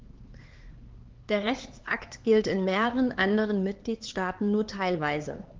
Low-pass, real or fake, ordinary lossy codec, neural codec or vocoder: 7.2 kHz; fake; Opus, 16 kbps; codec, 16 kHz, 4 kbps, X-Codec, HuBERT features, trained on LibriSpeech